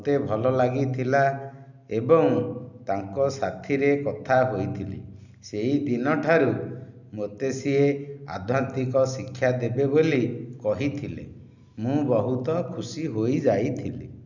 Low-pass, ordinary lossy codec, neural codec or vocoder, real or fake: 7.2 kHz; none; none; real